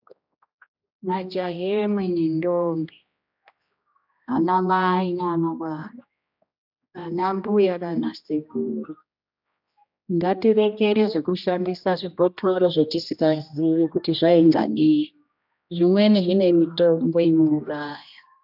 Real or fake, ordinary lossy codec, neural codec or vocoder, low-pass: fake; AAC, 48 kbps; codec, 16 kHz, 1 kbps, X-Codec, HuBERT features, trained on general audio; 5.4 kHz